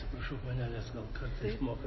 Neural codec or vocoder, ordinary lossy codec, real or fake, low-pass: none; MP3, 24 kbps; real; 7.2 kHz